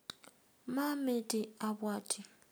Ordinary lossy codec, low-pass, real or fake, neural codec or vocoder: none; none; real; none